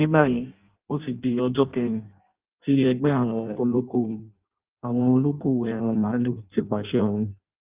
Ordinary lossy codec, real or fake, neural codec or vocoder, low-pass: Opus, 32 kbps; fake; codec, 16 kHz in and 24 kHz out, 0.6 kbps, FireRedTTS-2 codec; 3.6 kHz